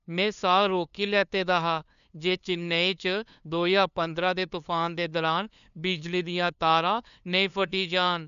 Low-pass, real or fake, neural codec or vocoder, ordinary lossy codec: 7.2 kHz; fake; codec, 16 kHz, 2 kbps, FunCodec, trained on LibriTTS, 25 frames a second; none